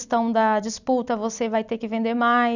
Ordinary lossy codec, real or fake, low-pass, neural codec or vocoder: none; fake; 7.2 kHz; vocoder, 44.1 kHz, 128 mel bands every 256 samples, BigVGAN v2